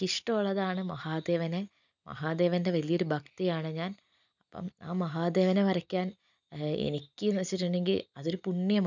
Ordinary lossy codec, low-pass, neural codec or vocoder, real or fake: none; 7.2 kHz; none; real